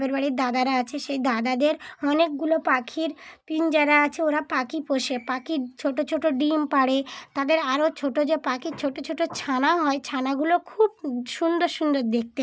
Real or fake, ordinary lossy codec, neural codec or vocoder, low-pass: real; none; none; none